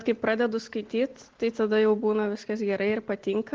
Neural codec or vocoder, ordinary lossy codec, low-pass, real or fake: none; Opus, 16 kbps; 7.2 kHz; real